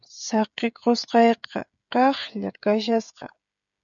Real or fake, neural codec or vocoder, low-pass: fake; codec, 16 kHz, 16 kbps, FreqCodec, smaller model; 7.2 kHz